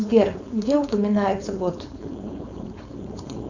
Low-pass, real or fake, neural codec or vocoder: 7.2 kHz; fake; codec, 16 kHz, 4.8 kbps, FACodec